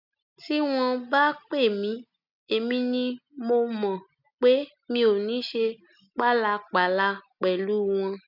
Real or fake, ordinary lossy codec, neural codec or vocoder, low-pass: real; none; none; 5.4 kHz